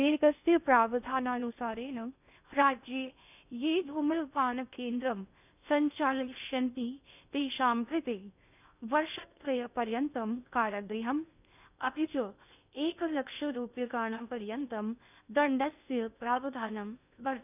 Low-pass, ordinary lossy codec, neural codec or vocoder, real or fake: 3.6 kHz; none; codec, 16 kHz in and 24 kHz out, 0.6 kbps, FocalCodec, streaming, 2048 codes; fake